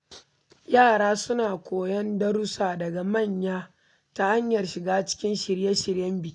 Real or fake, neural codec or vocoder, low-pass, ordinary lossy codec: real; none; 10.8 kHz; none